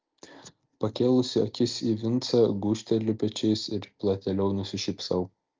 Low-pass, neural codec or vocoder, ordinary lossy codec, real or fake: 7.2 kHz; none; Opus, 24 kbps; real